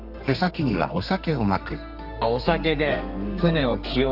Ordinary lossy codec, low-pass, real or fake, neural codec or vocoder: none; 5.4 kHz; fake; codec, 32 kHz, 1.9 kbps, SNAC